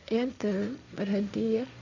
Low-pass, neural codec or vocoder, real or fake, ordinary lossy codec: 7.2 kHz; codec, 16 kHz, 1.1 kbps, Voila-Tokenizer; fake; none